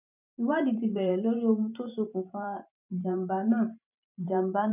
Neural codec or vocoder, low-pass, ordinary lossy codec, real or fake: vocoder, 44.1 kHz, 128 mel bands every 512 samples, BigVGAN v2; 3.6 kHz; none; fake